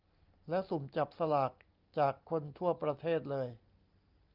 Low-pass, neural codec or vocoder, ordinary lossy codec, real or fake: 5.4 kHz; none; Opus, 24 kbps; real